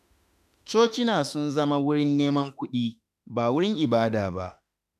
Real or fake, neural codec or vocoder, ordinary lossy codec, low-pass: fake; autoencoder, 48 kHz, 32 numbers a frame, DAC-VAE, trained on Japanese speech; none; 14.4 kHz